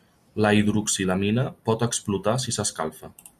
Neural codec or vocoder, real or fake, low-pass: none; real; 14.4 kHz